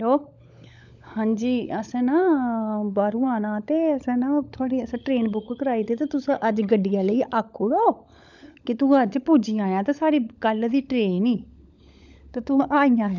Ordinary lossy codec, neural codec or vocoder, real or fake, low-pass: none; codec, 16 kHz, 16 kbps, FunCodec, trained on LibriTTS, 50 frames a second; fake; 7.2 kHz